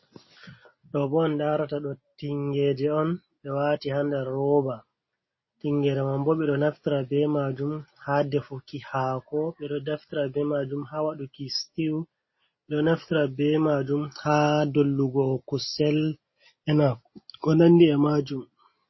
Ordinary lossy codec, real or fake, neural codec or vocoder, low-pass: MP3, 24 kbps; real; none; 7.2 kHz